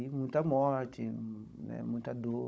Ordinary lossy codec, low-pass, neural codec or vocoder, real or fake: none; none; codec, 16 kHz, 16 kbps, FunCodec, trained on Chinese and English, 50 frames a second; fake